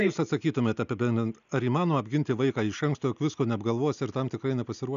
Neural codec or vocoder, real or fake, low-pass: none; real; 7.2 kHz